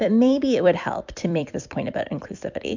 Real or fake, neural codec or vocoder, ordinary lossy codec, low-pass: fake; vocoder, 44.1 kHz, 128 mel bands every 256 samples, BigVGAN v2; MP3, 64 kbps; 7.2 kHz